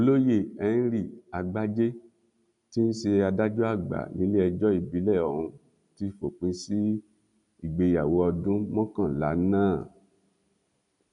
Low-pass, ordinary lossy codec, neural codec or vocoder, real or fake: 10.8 kHz; none; none; real